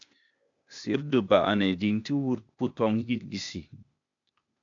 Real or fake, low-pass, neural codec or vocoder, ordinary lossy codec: fake; 7.2 kHz; codec, 16 kHz, 0.8 kbps, ZipCodec; MP3, 64 kbps